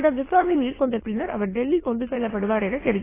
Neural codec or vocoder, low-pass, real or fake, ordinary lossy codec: autoencoder, 22.05 kHz, a latent of 192 numbers a frame, VITS, trained on many speakers; 3.6 kHz; fake; AAC, 16 kbps